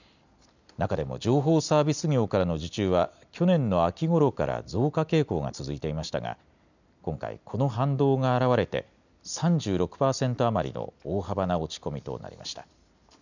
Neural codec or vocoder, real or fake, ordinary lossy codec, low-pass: none; real; none; 7.2 kHz